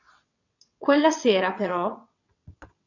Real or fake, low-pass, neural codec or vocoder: fake; 7.2 kHz; vocoder, 22.05 kHz, 80 mel bands, WaveNeXt